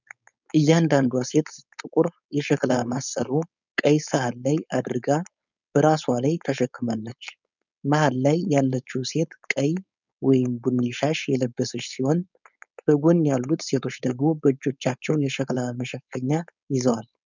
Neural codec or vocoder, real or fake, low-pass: codec, 16 kHz, 4.8 kbps, FACodec; fake; 7.2 kHz